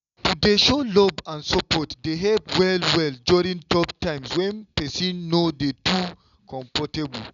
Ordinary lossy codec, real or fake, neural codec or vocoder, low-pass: none; real; none; 7.2 kHz